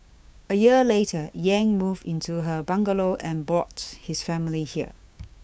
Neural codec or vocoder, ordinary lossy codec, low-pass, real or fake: codec, 16 kHz, 6 kbps, DAC; none; none; fake